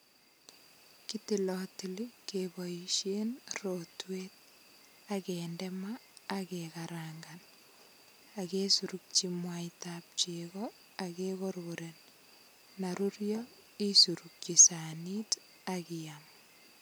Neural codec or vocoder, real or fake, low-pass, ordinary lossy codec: none; real; none; none